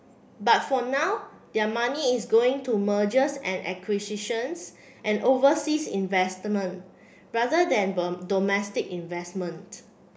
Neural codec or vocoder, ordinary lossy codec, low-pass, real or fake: none; none; none; real